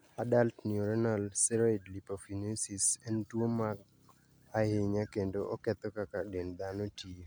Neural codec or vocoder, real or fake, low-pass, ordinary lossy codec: none; real; none; none